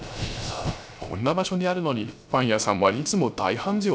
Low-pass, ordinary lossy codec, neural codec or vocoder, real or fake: none; none; codec, 16 kHz, 0.3 kbps, FocalCodec; fake